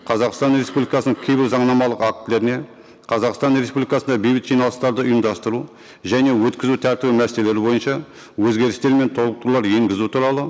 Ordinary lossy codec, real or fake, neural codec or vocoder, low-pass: none; real; none; none